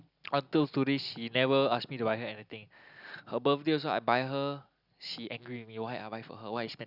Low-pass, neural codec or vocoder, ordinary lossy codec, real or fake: 5.4 kHz; none; none; real